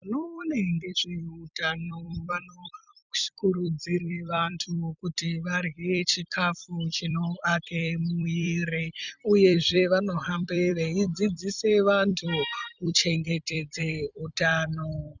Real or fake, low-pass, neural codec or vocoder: fake; 7.2 kHz; vocoder, 44.1 kHz, 128 mel bands every 256 samples, BigVGAN v2